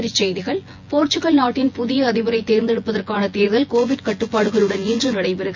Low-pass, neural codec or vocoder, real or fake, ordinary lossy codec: 7.2 kHz; vocoder, 24 kHz, 100 mel bands, Vocos; fake; MP3, 64 kbps